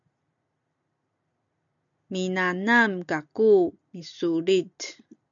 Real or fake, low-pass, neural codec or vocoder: real; 7.2 kHz; none